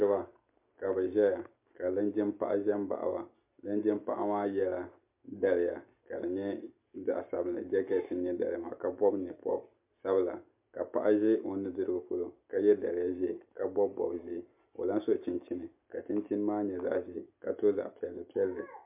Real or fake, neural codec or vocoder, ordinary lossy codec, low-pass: real; none; MP3, 32 kbps; 3.6 kHz